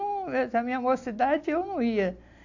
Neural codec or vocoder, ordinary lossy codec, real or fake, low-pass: none; AAC, 48 kbps; real; 7.2 kHz